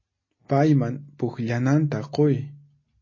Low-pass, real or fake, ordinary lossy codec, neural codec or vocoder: 7.2 kHz; real; MP3, 32 kbps; none